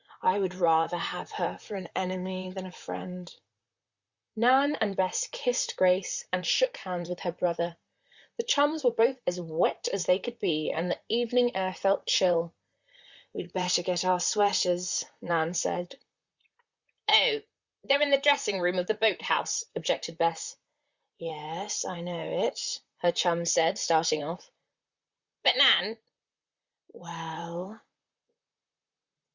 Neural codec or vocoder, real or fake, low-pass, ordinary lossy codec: vocoder, 44.1 kHz, 128 mel bands, Pupu-Vocoder; fake; 7.2 kHz; Opus, 64 kbps